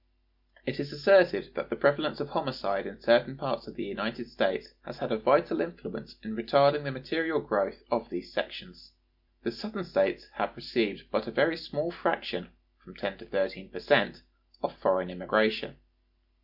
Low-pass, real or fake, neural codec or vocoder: 5.4 kHz; real; none